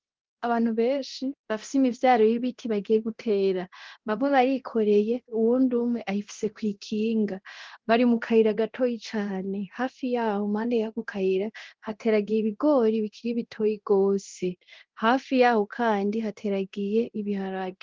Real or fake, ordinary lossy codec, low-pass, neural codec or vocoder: fake; Opus, 16 kbps; 7.2 kHz; codec, 24 kHz, 0.9 kbps, DualCodec